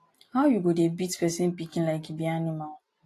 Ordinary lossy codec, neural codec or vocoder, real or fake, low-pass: AAC, 48 kbps; none; real; 14.4 kHz